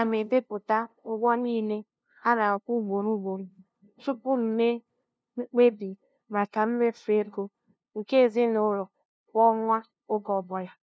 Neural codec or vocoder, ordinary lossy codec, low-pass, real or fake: codec, 16 kHz, 0.5 kbps, FunCodec, trained on LibriTTS, 25 frames a second; none; none; fake